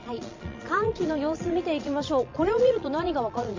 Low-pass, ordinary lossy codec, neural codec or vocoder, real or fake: 7.2 kHz; MP3, 48 kbps; vocoder, 22.05 kHz, 80 mel bands, Vocos; fake